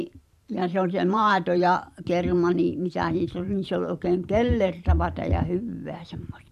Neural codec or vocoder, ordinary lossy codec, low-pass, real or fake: vocoder, 44.1 kHz, 128 mel bands every 512 samples, BigVGAN v2; none; 14.4 kHz; fake